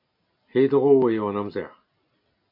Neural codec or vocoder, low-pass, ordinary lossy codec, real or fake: none; 5.4 kHz; MP3, 32 kbps; real